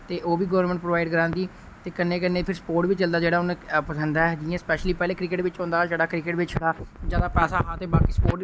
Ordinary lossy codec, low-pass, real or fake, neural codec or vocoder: none; none; real; none